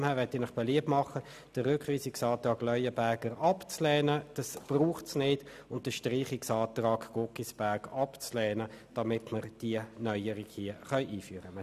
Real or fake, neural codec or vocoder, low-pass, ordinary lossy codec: real; none; 14.4 kHz; none